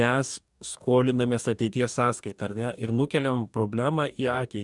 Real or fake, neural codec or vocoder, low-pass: fake; codec, 44.1 kHz, 2.6 kbps, DAC; 10.8 kHz